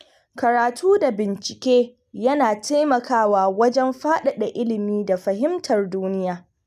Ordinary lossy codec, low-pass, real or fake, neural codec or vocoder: none; 14.4 kHz; real; none